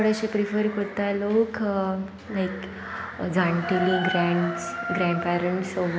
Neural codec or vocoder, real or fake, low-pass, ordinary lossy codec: none; real; none; none